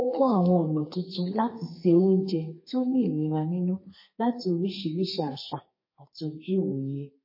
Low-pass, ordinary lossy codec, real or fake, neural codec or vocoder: 5.4 kHz; MP3, 24 kbps; fake; codec, 32 kHz, 1.9 kbps, SNAC